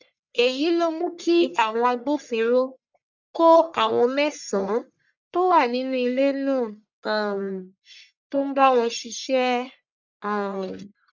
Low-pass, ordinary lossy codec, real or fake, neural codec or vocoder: 7.2 kHz; none; fake; codec, 44.1 kHz, 1.7 kbps, Pupu-Codec